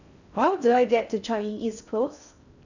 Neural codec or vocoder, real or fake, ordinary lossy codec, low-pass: codec, 16 kHz in and 24 kHz out, 0.6 kbps, FocalCodec, streaming, 4096 codes; fake; none; 7.2 kHz